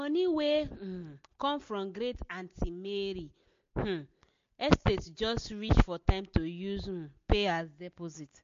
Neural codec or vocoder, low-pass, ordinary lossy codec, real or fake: none; 7.2 kHz; MP3, 48 kbps; real